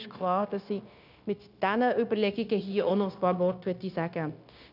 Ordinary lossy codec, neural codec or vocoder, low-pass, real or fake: none; codec, 16 kHz, 0.9 kbps, LongCat-Audio-Codec; 5.4 kHz; fake